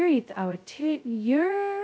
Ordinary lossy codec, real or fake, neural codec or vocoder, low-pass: none; fake; codec, 16 kHz, 0.2 kbps, FocalCodec; none